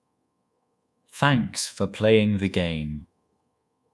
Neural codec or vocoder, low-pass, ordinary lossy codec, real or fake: codec, 24 kHz, 1.2 kbps, DualCodec; none; none; fake